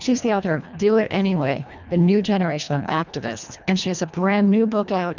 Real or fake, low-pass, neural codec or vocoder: fake; 7.2 kHz; codec, 24 kHz, 1.5 kbps, HILCodec